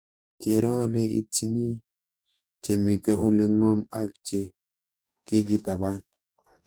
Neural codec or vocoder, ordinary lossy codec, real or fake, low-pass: codec, 44.1 kHz, 2.6 kbps, DAC; none; fake; none